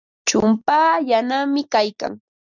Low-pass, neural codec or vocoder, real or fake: 7.2 kHz; none; real